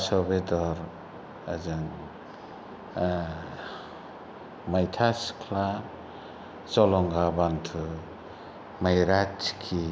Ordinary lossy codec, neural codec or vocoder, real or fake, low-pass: none; none; real; none